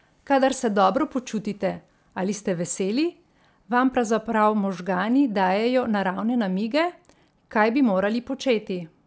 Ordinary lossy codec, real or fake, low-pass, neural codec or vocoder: none; real; none; none